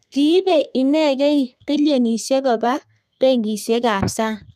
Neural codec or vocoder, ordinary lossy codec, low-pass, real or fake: codec, 32 kHz, 1.9 kbps, SNAC; none; 14.4 kHz; fake